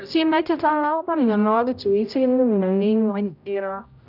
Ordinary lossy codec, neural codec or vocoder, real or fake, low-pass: none; codec, 16 kHz, 0.5 kbps, X-Codec, HuBERT features, trained on general audio; fake; 5.4 kHz